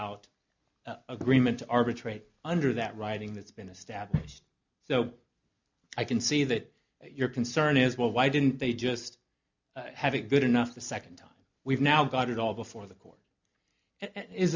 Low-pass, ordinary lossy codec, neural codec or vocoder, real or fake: 7.2 kHz; MP3, 64 kbps; none; real